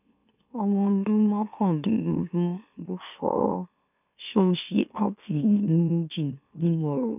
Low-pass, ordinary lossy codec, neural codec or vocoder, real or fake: 3.6 kHz; none; autoencoder, 44.1 kHz, a latent of 192 numbers a frame, MeloTTS; fake